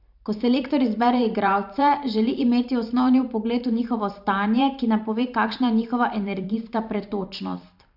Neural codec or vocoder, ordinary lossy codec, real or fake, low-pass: vocoder, 44.1 kHz, 128 mel bands every 512 samples, BigVGAN v2; none; fake; 5.4 kHz